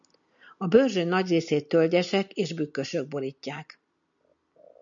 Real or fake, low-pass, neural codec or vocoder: real; 7.2 kHz; none